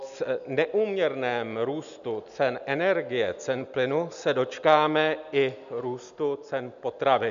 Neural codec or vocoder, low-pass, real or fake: none; 7.2 kHz; real